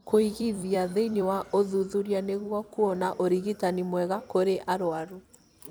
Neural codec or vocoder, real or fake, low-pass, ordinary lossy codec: vocoder, 44.1 kHz, 128 mel bands every 256 samples, BigVGAN v2; fake; none; none